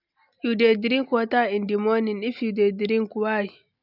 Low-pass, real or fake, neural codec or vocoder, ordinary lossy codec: 5.4 kHz; real; none; none